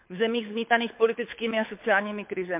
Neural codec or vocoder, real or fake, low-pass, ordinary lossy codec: codec, 24 kHz, 6 kbps, HILCodec; fake; 3.6 kHz; MP3, 32 kbps